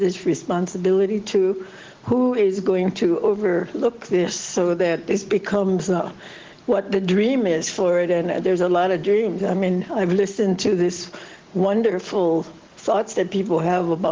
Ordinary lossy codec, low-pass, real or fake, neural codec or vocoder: Opus, 16 kbps; 7.2 kHz; real; none